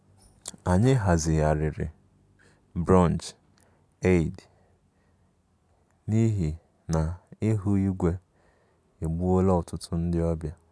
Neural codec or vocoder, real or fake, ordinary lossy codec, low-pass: none; real; none; none